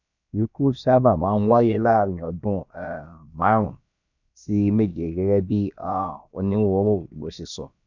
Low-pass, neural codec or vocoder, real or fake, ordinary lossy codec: 7.2 kHz; codec, 16 kHz, about 1 kbps, DyCAST, with the encoder's durations; fake; none